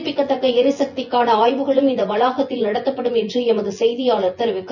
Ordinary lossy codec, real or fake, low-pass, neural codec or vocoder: none; fake; 7.2 kHz; vocoder, 24 kHz, 100 mel bands, Vocos